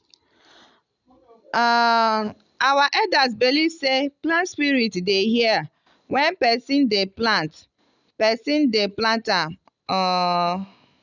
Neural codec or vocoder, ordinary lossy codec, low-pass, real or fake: none; none; 7.2 kHz; real